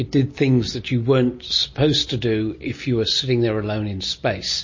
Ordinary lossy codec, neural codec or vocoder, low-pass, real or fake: MP3, 32 kbps; none; 7.2 kHz; real